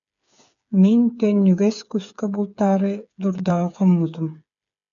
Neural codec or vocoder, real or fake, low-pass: codec, 16 kHz, 8 kbps, FreqCodec, smaller model; fake; 7.2 kHz